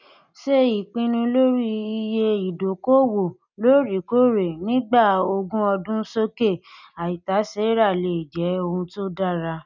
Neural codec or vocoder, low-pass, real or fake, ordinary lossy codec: none; 7.2 kHz; real; none